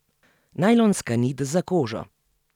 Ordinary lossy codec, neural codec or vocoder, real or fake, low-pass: none; none; real; 19.8 kHz